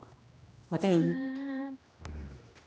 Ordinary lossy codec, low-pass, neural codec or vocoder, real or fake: none; none; codec, 16 kHz, 1 kbps, X-Codec, HuBERT features, trained on general audio; fake